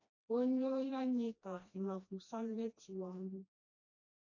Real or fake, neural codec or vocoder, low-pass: fake; codec, 16 kHz, 1 kbps, FreqCodec, smaller model; 7.2 kHz